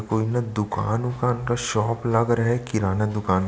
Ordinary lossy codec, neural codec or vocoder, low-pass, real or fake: none; none; none; real